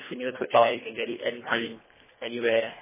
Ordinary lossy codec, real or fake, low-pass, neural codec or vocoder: MP3, 16 kbps; fake; 3.6 kHz; codec, 24 kHz, 1.5 kbps, HILCodec